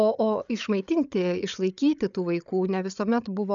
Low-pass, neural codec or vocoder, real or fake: 7.2 kHz; codec, 16 kHz, 8 kbps, FreqCodec, larger model; fake